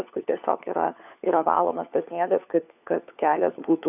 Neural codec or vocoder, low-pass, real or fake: codec, 16 kHz, 4 kbps, FunCodec, trained on LibriTTS, 50 frames a second; 3.6 kHz; fake